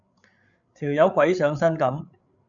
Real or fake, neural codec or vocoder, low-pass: fake; codec, 16 kHz, 16 kbps, FreqCodec, larger model; 7.2 kHz